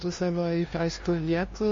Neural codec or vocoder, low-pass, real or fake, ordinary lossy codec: codec, 16 kHz, 0.5 kbps, FunCodec, trained on LibriTTS, 25 frames a second; 7.2 kHz; fake; MP3, 32 kbps